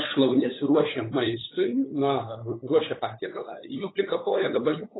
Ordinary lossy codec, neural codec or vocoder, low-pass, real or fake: AAC, 16 kbps; codec, 16 kHz, 8 kbps, FunCodec, trained on LibriTTS, 25 frames a second; 7.2 kHz; fake